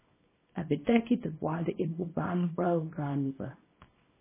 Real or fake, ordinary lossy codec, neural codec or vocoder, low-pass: fake; MP3, 16 kbps; codec, 24 kHz, 0.9 kbps, WavTokenizer, small release; 3.6 kHz